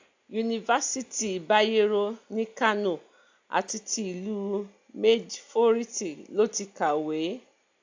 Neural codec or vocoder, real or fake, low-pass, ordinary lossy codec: none; real; 7.2 kHz; AAC, 48 kbps